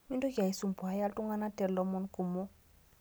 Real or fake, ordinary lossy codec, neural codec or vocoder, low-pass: real; none; none; none